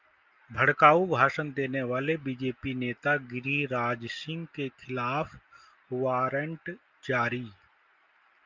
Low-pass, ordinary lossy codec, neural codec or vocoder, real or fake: 7.2 kHz; Opus, 24 kbps; none; real